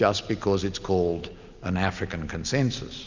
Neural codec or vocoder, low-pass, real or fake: none; 7.2 kHz; real